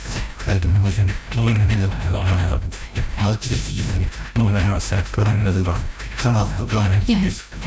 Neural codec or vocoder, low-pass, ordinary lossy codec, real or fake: codec, 16 kHz, 0.5 kbps, FreqCodec, larger model; none; none; fake